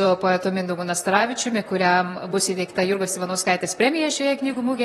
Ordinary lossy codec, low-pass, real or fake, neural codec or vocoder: AAC, 32 kbps; 19.8 kHz; fake; vocoder, 44.1 kHz, 128 mel bands, Pupu-Vocoder